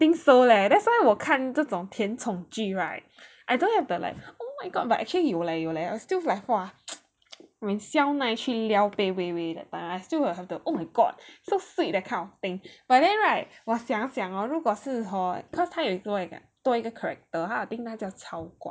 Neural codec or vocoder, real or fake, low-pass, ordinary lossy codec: none; real; none; none